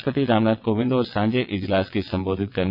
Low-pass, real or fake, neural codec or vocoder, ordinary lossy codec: 5.4 kHz; fake; vocoder, 22.05 kHz, 80 mel bands, WaveNeXt; none